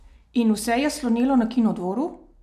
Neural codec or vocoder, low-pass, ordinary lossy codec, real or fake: none; 14.4 kHz; none; real